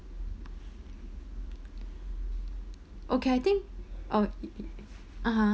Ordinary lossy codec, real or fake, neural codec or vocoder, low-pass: none; real; none; none